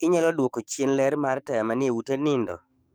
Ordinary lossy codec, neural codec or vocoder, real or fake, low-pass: none; codec, 44.1 kHz, 7.8 kbps, DAC; fake; none